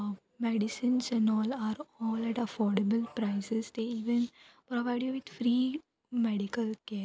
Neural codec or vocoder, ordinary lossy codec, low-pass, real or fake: none; none; none; real